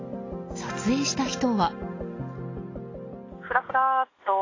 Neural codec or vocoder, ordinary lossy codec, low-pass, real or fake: none; AAC, 32 kbps; 7.2 kHz; real